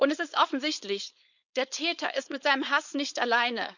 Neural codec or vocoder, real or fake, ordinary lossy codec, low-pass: codec, 16 kHz, 4.8 kbps, FACodec; fake; none; 7.2 kHz